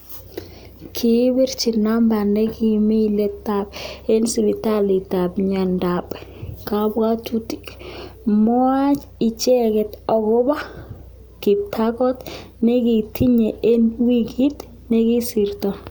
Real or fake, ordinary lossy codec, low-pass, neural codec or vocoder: real; none; none; none